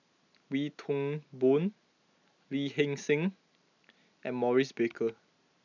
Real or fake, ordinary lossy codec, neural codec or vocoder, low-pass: real; none; none; 7.2 kHz